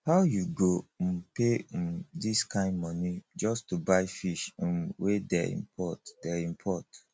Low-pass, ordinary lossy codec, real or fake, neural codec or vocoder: none; none; real; none